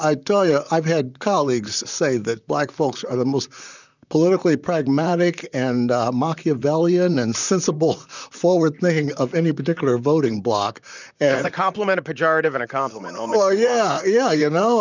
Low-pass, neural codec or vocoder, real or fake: 7.2 kHz; vocoder, 44.1 kHz, 128 mel bands, Pupu-Vocoder; fake